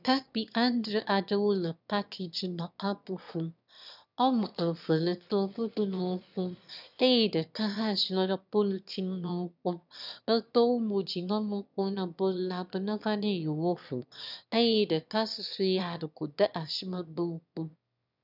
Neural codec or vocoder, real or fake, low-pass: autoencoder, 22.05 kHz, a latent of 192 numbers a frame, VITS, trained on one speaker; fake; 5.4 kHz